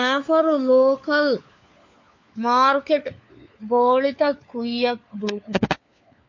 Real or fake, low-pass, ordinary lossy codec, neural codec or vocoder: fake; 7.2 kHz; MP3, 48 kbps; codec, 16 kHz, 4 kbps, FunCodec, trained on LibriTTS, 50 frames a second